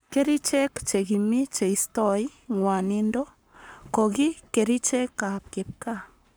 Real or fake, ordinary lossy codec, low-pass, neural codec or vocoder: fake; none; none; codec, 44.1 kHz, 7.8 kbps, Pupu-Codec